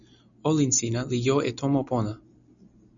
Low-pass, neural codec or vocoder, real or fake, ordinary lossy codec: 7.2 kHz; none; real; MP3, 96 kbps